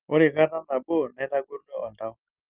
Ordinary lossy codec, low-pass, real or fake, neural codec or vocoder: Opus, 32 kbps; 3.6 kHz; real; none